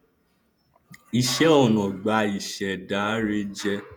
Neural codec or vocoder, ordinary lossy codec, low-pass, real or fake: vocoder, 48 kHz, 128 mel bands, Vocos; none; none; fake